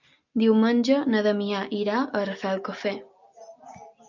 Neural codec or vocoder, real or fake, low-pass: none; real; 7.2 kHz